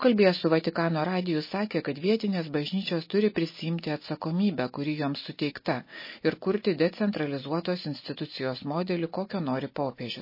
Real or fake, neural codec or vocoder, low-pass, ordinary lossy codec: real; none; 5.4 kHz; MP3, 24 kbps